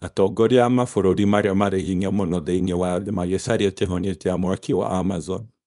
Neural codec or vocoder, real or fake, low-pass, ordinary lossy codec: codec, 24 kHz, 0.9 kbps, WavTokenizer, small release; fake; 10.8 kHz; none